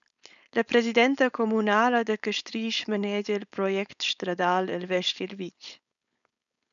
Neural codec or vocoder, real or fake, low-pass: codec, 16 kHz, 4.8 kbps, FACodec; fake; 7.2 kHz